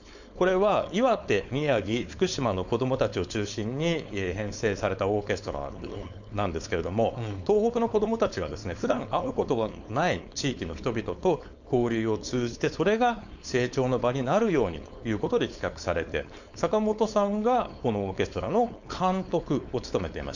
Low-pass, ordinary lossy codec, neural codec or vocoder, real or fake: 7.2 kHz; none; codec, 16 kHz, 4.8 kbps, FACodec; fake